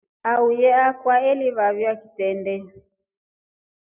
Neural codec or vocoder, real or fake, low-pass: none; real; 3.6 kHz